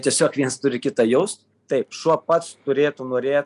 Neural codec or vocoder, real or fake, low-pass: none; real; 10.8 kHz